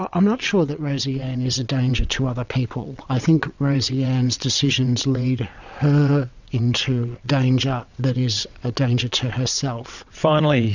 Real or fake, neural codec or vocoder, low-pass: fake; vocoder, 22.05 kHz, 80 mel bands, WaveNeXt; 7.2 kHz